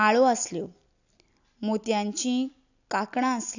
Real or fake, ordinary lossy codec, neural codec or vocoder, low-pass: real; none; none; 7.2 kHz